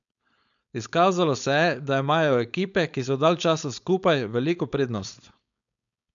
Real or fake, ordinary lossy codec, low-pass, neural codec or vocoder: fake; none; 7.2 kHz; codec, 16 kHz, 4.8 kbps, FACodec